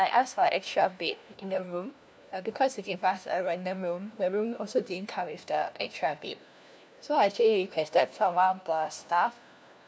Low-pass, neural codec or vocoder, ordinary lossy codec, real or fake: none; codec, 16 kHz, 1 kbps, FunCodec, trained on LibriTTS, 50 frames a second; none; fake